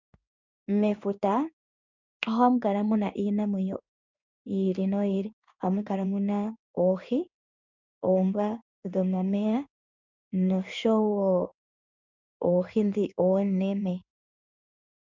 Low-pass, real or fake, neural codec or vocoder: 7.2 kHz; fake; codec, 16 kHz in and 24 kHz out, 1 kbps, XY-Tokenizer